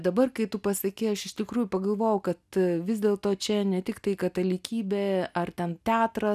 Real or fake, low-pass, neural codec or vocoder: real; 14.4 kHz; none